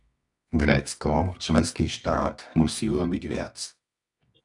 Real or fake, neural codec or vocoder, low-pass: fake; codec, 24 kHz, 0.9 kbps, WavTokenizer, medium music audio release; 10.8 kHz